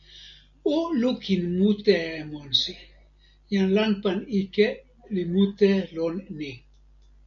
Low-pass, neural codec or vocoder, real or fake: 7.2 kHz; none; real